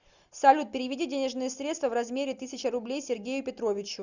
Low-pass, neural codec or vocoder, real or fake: 7.2 kHz; none; real